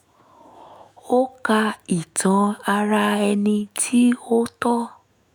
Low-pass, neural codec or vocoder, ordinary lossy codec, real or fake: none; autoencoder, 48 kHz, 128 numbers a frame, DAC-VAE, trained on Japanese speech; none; fake